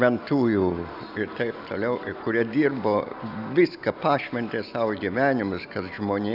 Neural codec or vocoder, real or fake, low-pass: none; real; 5.4 kHz